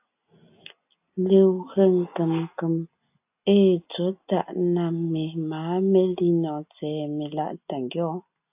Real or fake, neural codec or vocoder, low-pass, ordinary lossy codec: real; none; 3.6 kHz; AAC, 32 kbps